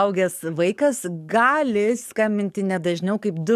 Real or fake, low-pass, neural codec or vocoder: fake; 14.4 kHz; codec, 44.1 kHz, 7.8 kbps, DAC